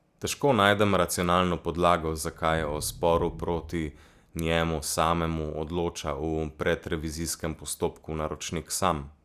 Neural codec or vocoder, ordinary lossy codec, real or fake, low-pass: none; Opus, 64 kbps; real; 14.4 kHz